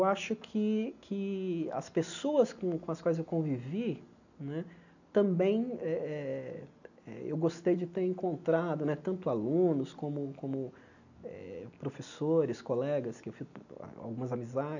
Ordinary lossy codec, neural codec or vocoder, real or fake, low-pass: none; none; real; 7.2 kHz